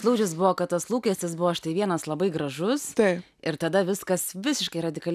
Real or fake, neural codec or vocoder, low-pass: real; none; 14.4 kHz